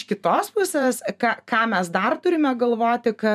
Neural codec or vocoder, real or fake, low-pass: vocoder, 44.1 kHz, 128 mel bands every 512 samples, BigVGAN v2; fake; 14.4 kHz